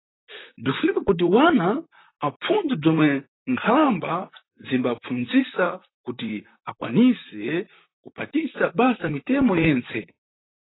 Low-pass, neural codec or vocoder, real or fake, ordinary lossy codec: 7.2 kHz; vocoder, 44.1 kHz, 128 mel bands, Pupu-Vocoder; fake; AAC, 16 kbps